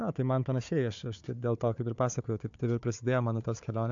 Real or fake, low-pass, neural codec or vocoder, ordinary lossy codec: fake; 7.2 kHz; codec, 16 kHz, 4 kbps, FunCodec, trained on Chinese and English, 50 frames a second; AAC, 64 kbps